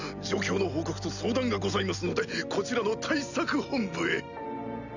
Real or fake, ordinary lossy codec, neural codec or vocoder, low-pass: real; none; none; 7.2 kHz